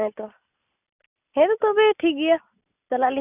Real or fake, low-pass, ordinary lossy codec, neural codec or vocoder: real; 3.6 kHz; none; none